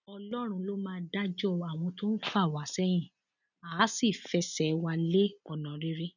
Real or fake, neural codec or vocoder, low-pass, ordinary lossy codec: real; none; 7.2 kHz; none